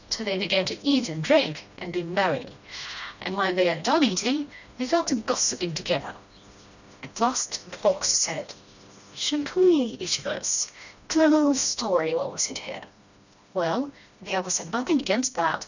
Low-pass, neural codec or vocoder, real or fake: 7.2 kHz; codec, 16 kHz, 1 kbps, FreqCodec, smaller model; fake